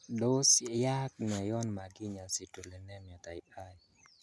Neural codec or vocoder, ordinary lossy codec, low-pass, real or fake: none; none; none; real